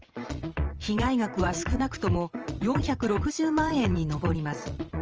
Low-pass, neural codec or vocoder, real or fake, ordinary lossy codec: 7.2 kHz; vocoder, 22.05 kHz, 80 mel bands, Vocos; fake; Opus, 24 kbps